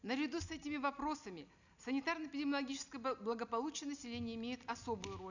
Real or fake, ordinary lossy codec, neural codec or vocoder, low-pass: real; none; none; 7.2 kHz